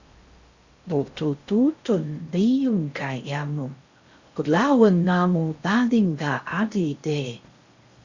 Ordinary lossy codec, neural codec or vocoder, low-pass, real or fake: Opus, 64 kbps; codec, 16 kHz in and 24 kHz out, 0.6 kbps, FocalCodec, streaming, 4096 codes; 7.2 kHz; fake